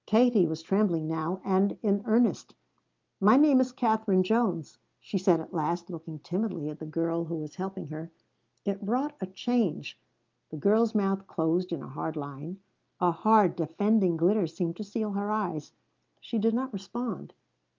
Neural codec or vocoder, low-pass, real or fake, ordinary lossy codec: none; 7.2 kHz; real; Opus, 32 kbps